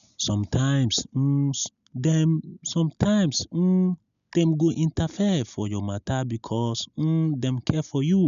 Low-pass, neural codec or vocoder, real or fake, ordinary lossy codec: 7.2 kHz; none; real; none